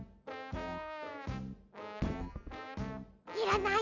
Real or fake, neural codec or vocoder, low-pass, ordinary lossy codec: real; none; 7.2 kHz; none